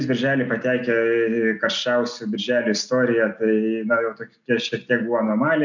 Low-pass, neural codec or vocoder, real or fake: 7.2 kHz; none; real